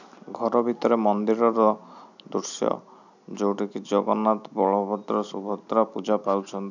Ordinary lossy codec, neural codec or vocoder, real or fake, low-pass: none; none; real; 7.2 kHz